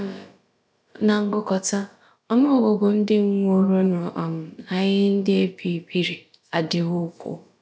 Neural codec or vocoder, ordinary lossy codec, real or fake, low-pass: codec, 16 kHz, about 1 kbps, DyCAST, with the encoder's durations; none; fake; none